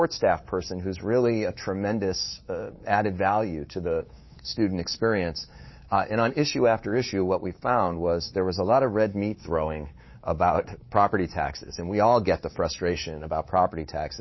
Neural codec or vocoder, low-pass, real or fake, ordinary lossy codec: codec, 24 kHz, 3.1 kbps, DualCodec; 7.2 kHz; fake; MP3, 24 kbps